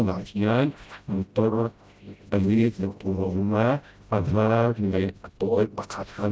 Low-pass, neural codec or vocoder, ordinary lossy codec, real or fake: none; codec, 16 kHz, 0.5 kbps, FreqCodec, smaller model; none; fake